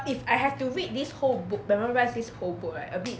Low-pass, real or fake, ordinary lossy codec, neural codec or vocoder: none; real; none; none